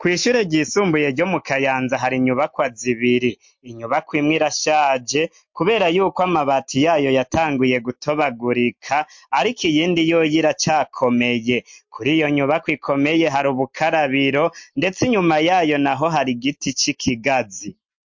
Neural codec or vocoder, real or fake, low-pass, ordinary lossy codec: none; real; 7.2 kHz; MP3, 48 kbps